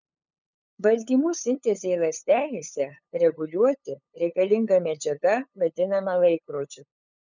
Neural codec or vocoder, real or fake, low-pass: codec, 16 kHz, 8 kbps, FunCodec, trained on LibriTTS, 25 frames a second; fake; 7.2 kHz